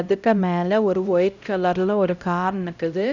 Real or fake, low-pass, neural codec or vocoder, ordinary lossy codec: fake; 7.2 kHz; codec, 16 kHz, 0.5 kbps, X-Codec, HuBERT features, trained on LibriSpeech; none